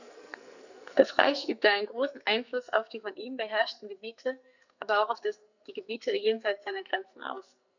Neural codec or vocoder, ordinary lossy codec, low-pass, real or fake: codec, 44.1 kHz, 2.6 kbps, SNAC; none; 7.2 kHz; fake